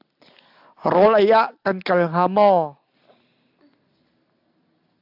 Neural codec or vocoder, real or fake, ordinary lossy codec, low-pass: none; real; AAC, 48 kbps; 5.4 kHz